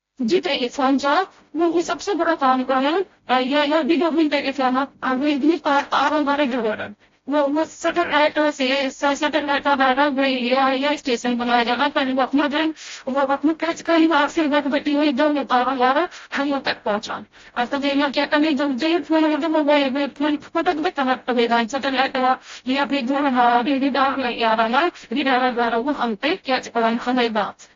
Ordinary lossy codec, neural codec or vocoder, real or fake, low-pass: AAC, 32 kbps; codec, 16 kHz, 0.5 kbps, FreqCodec, smaller model; fake; 7.2 kHz